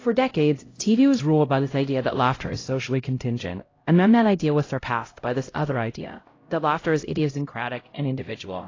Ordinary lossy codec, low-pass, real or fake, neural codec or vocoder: AAC, 32 kbps; 7.2 kHz; fake; codec, 16 kHz, 0.5 kbps, X-Codec, HuBERT features, trained on LibriSpeech